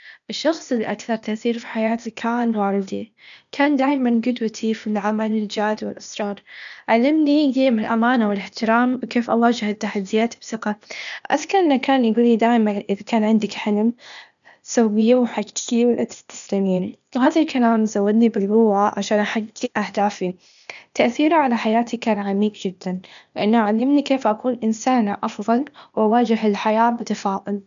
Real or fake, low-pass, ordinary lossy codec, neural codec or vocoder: fake; 7.2 kHz; none; codec, 16 kHz, 0.8 kbps, ZipCodec